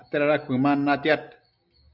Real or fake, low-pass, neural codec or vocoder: real; 5.4 kHz; none